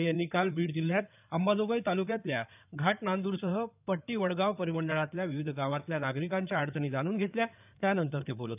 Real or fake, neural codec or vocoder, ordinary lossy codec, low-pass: fake; codec, 16 kHz in and 24 kHz out, 2.2 kbps, FireRedTTS-2 codec; none; 3.6 kHz